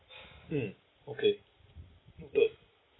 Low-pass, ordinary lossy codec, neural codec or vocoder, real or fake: 7.2 kHz; AAC, 16 kbps; none; real